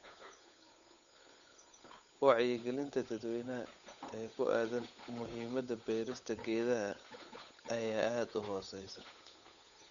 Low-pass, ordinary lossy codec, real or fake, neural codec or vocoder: 7.2 kHz; none; fake; codec, 16 kHz, 8 kbps, FunCodec, trained on Chinese and English, 25 frames a second